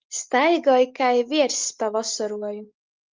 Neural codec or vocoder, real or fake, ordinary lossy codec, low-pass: none; real; Opus, 32 kbps; 7.2 kHz